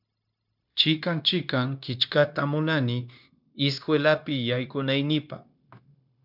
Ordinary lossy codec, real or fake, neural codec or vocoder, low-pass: MP3, 48 kbps; fake; codec, 16 kHz, 0.9 kbps, LongCat-Audio-Codec; 5.4 kHz